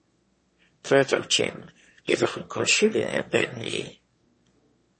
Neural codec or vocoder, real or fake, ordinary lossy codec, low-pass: autoencoder, 22.05 kHz, a latent of 192 numbers a frame, VITS, trained on one speaker; fake; MP3, 32 kbps; 9.9 kHz